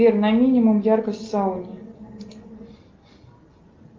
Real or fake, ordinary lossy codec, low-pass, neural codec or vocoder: real; Opus, 32 kbps; 7.2 kHz; none